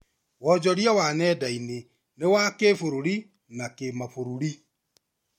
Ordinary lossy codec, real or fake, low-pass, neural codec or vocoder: MP3, 64 kbps; real; 19.8 kHz; none